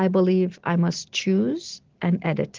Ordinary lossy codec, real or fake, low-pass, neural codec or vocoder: Opus, 16 kbps; real; 7.2 kHz; none